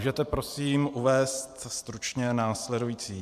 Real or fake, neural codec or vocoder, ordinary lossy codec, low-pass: fake; vocoder, 44.1 kHz, 128 mel bands every 512 samples, BigVGAN v2; MP3, 96 kbps; 14.4 kHz